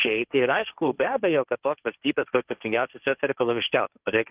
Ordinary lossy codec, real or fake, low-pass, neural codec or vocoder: Opus, 32 kbps; fake; 3.6 kHz; codec, 16 kHz, 1.1 kbps, Voila-Tokenizer